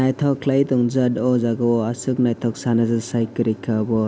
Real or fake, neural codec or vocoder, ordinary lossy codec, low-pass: real; none; none; none